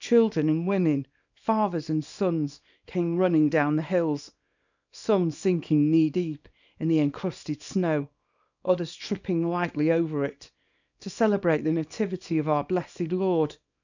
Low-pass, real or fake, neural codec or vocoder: 7.2 kHz; fake; codec, 24 kHz, 0.9 kbps, WavTokenizer, small release